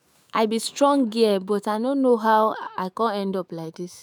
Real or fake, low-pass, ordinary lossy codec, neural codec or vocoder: fake; none; none; autoencoder, 48 kHz, 128 numbers a frame, DAC-VAE, trained on Japanese speech